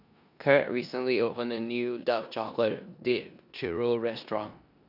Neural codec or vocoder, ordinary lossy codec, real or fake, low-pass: codec, 16 kHz in and 24 kHz out, 0.9 kbps, LongCat-Audio-Codec, four codebook decoder; none; fake; 5.4 kHz